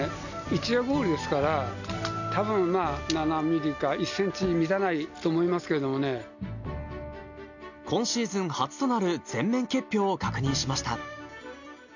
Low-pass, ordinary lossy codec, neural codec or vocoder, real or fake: 7.2 kHz; none; none; real